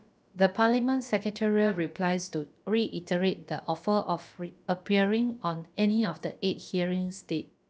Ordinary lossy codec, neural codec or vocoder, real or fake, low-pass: none; codec, 16 kHz, about 1 kbps, DyCAST, with the encoder's durations; fake; none